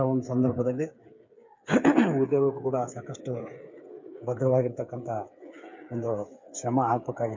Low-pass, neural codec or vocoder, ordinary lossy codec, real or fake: 7.2 kHz; codec, 16 kHz, 8 kbps, FreqCodec, smaller model; MP3, 48 kbps; fake